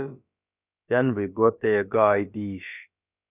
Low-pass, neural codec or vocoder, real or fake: 3.6 kHz; codec, 16 kHz, about 1 kbps, DyCAST, with the encoder's durations; fake